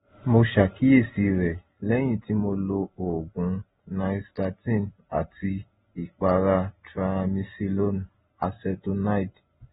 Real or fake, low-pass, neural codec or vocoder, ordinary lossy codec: real; 19.8 kHz; none; AAC, 16 kbps